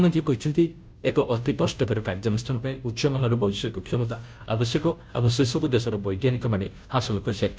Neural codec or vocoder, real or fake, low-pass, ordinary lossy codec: codec, 16 kHz, 0.5 kbps, FunCodec, trained on Chinese and English, 25 frames a second; fake; none; none